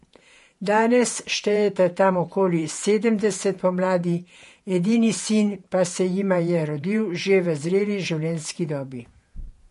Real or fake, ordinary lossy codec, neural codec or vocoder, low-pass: fake; MP3, 48 kbps; vocoder, 48 kHz, 128 mel bands, Vocos; 14.4 kHz